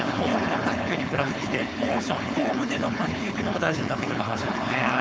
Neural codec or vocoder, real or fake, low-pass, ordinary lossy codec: codec, 16 kHz, 4.8 kbps, FACodec; fake; none; none